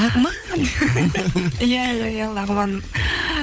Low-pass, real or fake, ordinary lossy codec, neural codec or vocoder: none; fake; none; codec, 16 kHz, 16 kbps, FunCodec, trained on LibriTTS, 50 frames a second